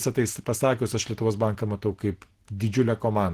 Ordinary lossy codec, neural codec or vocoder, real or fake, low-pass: Opus, 16 kbps; none; real; 14.4 kHz